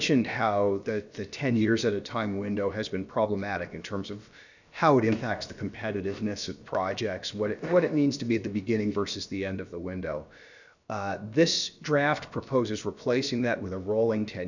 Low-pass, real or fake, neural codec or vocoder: 7.2 kHz; fake; codec, 16 kHz, about 1 kbps, DyCAST, with the encoder's durations